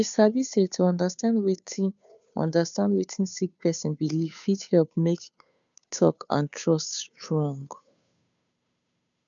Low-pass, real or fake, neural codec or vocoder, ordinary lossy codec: 7.2 kHz; fake; codec, 16 kHz, 2 kbps, FunCodec, trained on Chinese and English, 25 frames a second; none